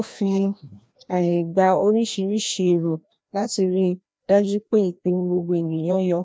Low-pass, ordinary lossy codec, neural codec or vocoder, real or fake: none; none; codec, 16 kHz, 1 kbps, FreqCodec, larger model; fake